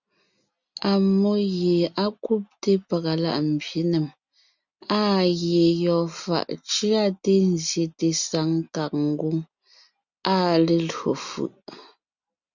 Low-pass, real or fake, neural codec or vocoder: 7.2 kHz; real; none